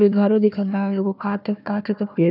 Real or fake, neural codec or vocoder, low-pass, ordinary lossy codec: fake; codec, 16 kHz, 1 kbps, FunCodec, trained on Chinese and English, 50 frames a second; 5.4 kHz; none